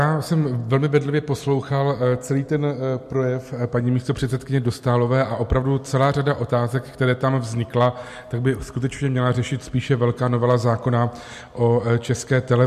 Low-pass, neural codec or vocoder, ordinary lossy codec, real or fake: 14.4 kHz; none; MP3, 64 kbps; real